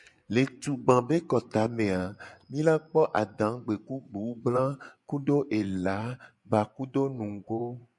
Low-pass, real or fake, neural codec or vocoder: 10.8 kHz; fake; vocoder, 24 kHz, 100 mel bands, Vocos